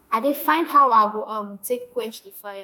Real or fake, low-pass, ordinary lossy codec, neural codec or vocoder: fake; none; none; autoencoder, 48 kHz, 32 numbers a frame, DAC-VAE, trained on Japanese speech